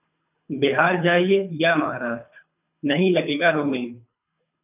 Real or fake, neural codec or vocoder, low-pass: fake; codec, 24 kHz, 3 kbps, HILCodec; 3.6 kHz